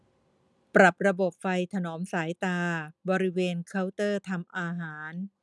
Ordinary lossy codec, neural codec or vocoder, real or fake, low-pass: none; none; real; none